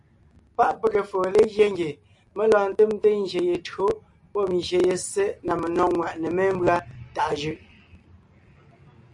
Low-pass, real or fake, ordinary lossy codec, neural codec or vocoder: 10.8 kHz; real; AAC, 64 kbps; none